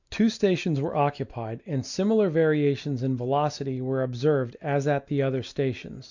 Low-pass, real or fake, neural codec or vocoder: 7.2 kHz; real; none